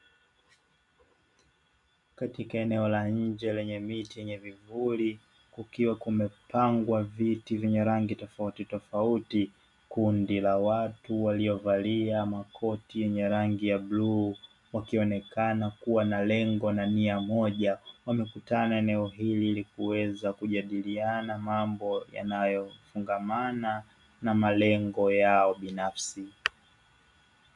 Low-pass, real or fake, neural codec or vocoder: 10.8 kHz; real; none